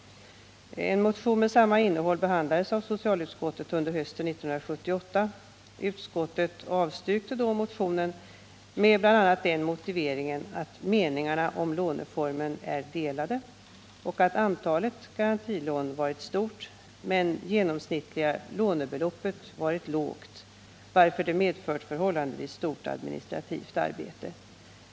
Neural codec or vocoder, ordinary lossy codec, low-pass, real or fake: none; none; none; real